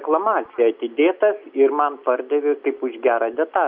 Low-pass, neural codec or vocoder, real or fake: 5.4 kHz; none; real